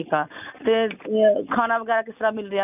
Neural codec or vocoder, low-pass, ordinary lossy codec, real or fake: none; 3.6 kHz; none; real